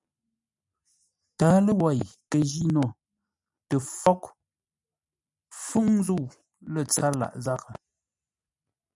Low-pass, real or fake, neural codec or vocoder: 10.8 kHz; real; none